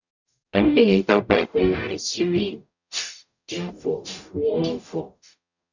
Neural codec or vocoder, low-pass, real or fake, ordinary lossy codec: codec, 44.1 kHz, 0.9 kbps, DAC; 7.2 kHz; fake; none